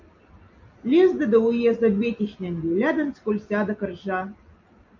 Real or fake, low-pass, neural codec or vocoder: real; 7.2 kHz; none